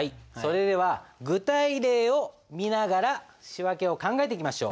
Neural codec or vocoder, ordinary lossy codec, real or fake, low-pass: none; none; real; none